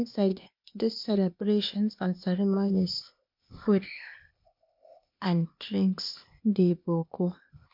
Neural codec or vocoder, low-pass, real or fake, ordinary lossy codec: codec, 16 kHz, 0.8 kbps, ZipCodec; 5.4 kHz; fake; MP3, 48 kbps